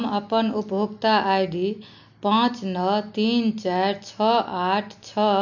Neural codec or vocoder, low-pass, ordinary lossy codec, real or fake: none; 7.2 kHz; none; real